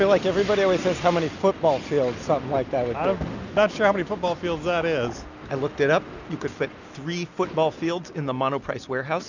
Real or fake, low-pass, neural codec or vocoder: real; 7.2 kHz; none